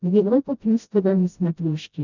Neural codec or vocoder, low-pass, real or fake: codec, 16 kHz, 0.5 kbps, FreqCodec, smaller model; 7.2 kHz; fake